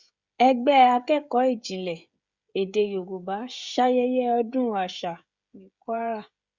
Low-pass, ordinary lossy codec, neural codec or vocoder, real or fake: 7.2 kHz; Opus, 64 kbps; codec, 16 kHz, 16 kbps, FreqCodec, smaller model; fake